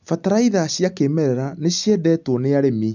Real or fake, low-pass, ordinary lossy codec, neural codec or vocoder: real; 7.2 kHz; none; none